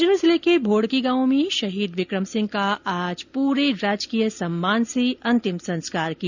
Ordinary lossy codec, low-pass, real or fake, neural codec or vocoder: none; 7.2 kHz; real; none